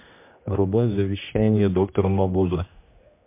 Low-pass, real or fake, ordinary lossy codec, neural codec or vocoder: 3.6 kHz; fake; MP3, 32 kbps; codec, 16 kHz, 1 kbps, X-Codec, HuBERT features, trained on general audio